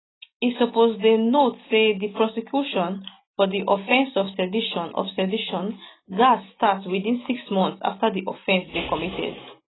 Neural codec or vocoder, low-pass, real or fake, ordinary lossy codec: none; 7.2 kHz; real; AAC, 16 kbps